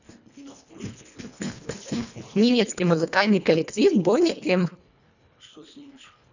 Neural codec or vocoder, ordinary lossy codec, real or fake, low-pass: codec, 24 kHz, 1.5 kbps, HILCodec; none; fake; 7.2 kHz